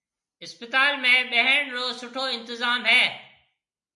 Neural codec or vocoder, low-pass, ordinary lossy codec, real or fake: none; 10.8 kHz; MP3, 64 kbps; real